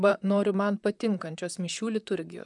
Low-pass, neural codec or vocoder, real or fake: 10.8 kHz; vocoder, 44.1 kHz, 128 mel bands, Pupu-Vocoder; fake